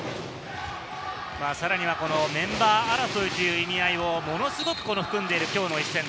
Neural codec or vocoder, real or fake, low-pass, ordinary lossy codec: none; real; none; none